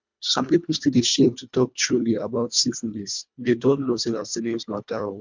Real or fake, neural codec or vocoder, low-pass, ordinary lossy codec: fake; codec, 24 kHz, 1.5 kbps, HILCodec; 7.2 kHz; MP3, 64 kbps